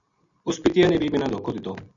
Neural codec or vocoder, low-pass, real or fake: none; 7.2 kHz; real